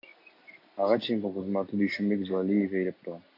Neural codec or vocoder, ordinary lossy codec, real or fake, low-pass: none; AAC, 32 kbps; real; 5.4 kHz